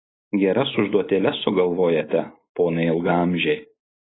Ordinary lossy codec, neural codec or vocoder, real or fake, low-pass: AAC, 16 kbps; none; real; 7.2 kHz